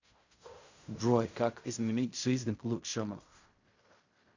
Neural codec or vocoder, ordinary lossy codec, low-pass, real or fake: codec, 16 kHz in and 24 kHz out, 0.4 kbps, LongCat-Audio-Codec, fine tuned four codebook decoder; Opus, 64 kbps; 7.2 kHz; fake